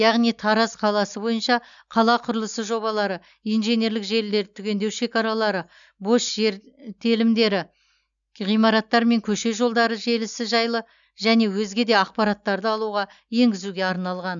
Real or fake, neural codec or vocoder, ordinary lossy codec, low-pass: real; none; none; 7.2 kHz